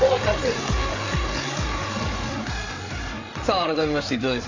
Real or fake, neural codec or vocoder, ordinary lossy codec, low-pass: fake; codec, 16 kHz, 8 kbps, FreqCodec, larger model; AAC, 32 kbps; 7.2 kHz